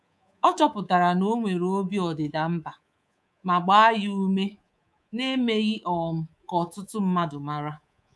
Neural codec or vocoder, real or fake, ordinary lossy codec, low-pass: codec, 24 kHz, 3.1 kbps, DualCodec; fake; none; none